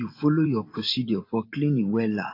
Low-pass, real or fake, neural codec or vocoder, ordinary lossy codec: 5.4 kHz; fake; vocoder, 44.1 kHz, 128 mel bands every 512 samples, BigVGAN v2; AAC, 32 kbps